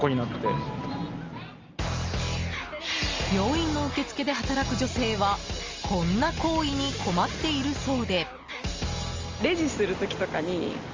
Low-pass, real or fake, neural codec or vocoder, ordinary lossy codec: 7.2 kHz; real; none; Opus, 32 kbps